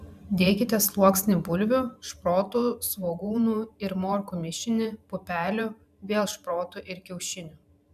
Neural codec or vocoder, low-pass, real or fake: vocoder, 44.1 kHz, 128 mel bands every 512 samples, BigVGAN v2; 14.4 kHz; fake